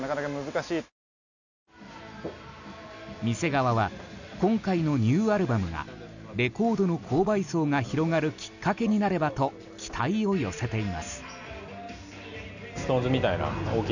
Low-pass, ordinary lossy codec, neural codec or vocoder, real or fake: 7.2 kHz; none; none; real